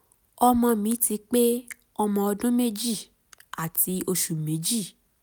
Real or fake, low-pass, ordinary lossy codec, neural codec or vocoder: real; none; none; none